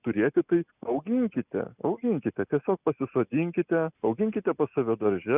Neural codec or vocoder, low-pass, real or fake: none; 3.6 kHz; real